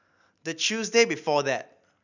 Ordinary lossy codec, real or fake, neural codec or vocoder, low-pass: none; real; none; 7.2 kHz